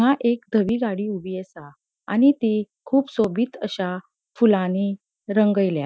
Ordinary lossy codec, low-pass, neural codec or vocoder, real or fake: none; none; none; real